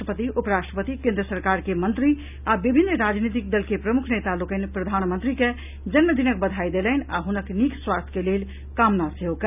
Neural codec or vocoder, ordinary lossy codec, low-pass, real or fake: none; none; 3.6 kHz; real